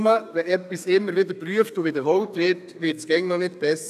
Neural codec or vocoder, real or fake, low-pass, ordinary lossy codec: codec, 32 kHz, 1.9 kbps, SNAC; fake; 14.4 kHz; none